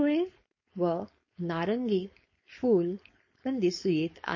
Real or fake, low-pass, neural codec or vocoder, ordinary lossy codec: fake; 7.2 kHz; codec, 16 kHz, 4.8 kbps, FACodec; MP3, 32 kbps